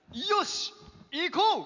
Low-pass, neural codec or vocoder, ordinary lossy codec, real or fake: 7.2 kHz; none; none; real